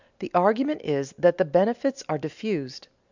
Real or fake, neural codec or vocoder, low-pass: real; none; 7.2 kHz